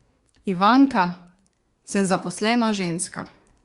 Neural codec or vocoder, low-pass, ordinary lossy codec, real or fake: codec, 24 kHz, 1 kbps, SNAC; 10.8 kHz; Opus, 64 kbps; fake